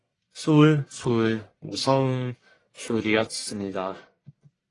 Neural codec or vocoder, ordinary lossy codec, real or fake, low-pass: codec, 44.1 kHz, 1.7 kbps, Pupu-Codec; AAC, 32 kbps; fake; 10.8 kHz